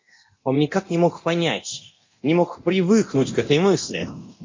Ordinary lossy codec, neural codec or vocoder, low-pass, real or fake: MP3, 48 kbps; codec, 24 kHz, 0.9 kbps, DualCodec; 7.2 kHz; fake